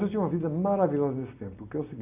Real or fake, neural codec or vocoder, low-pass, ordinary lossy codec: real; none; 3.6 kHz; AAC, 24 kbps